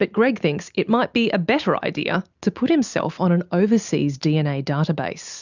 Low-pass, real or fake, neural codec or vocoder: 7.2 kHz; real; none